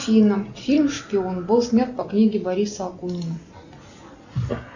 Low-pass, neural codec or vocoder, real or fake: 7.2 kHz; none; real